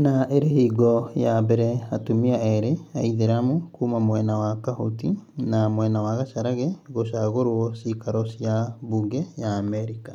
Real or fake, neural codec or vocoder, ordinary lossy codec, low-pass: real; none; MP3, 96 kbps; 19.8 kHz